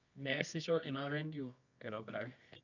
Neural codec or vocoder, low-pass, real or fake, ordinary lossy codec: codec, 24 kHz, 0.9 kbps, WavTokenizer, medium music audio release; 7.2 kHz; fake; none